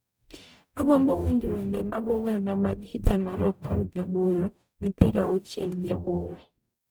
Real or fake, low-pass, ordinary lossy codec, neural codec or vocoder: fake; none; none; codec, 44.1 kHz, 0.9 kbps, DAC